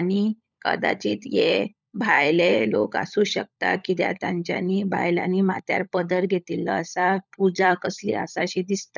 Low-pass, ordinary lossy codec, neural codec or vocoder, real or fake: 7.2 kHz; none; codec, 16 kHz, 16 kbps, FunCodec, trained on LibriTTS, 50 frames a second; fake